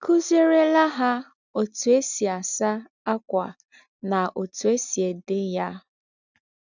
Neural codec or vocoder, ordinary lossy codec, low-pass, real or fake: none; none; 7.2 kHz; real